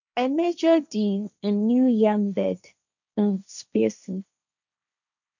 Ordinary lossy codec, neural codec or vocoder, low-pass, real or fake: none; codec, 16 kHz, 1.1 kbps, Voila-Tokenizer; 7.2 kHz; fake